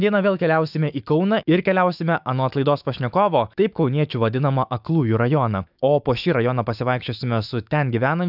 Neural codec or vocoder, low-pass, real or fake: autoencoder, 48 kHz, 128 numbers a frame, DAC-VAE, trained on Japanese speech; 5.4 kHz; fake